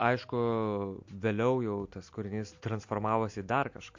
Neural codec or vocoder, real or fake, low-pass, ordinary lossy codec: none; real; 7.2 kHz; MP3, 48 kbps